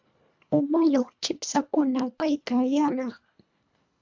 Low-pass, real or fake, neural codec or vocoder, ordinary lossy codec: 7.2 kHz; fake; codec, 24 kHz, 1.5 kbps, HILCodec; MP3, 64 kbps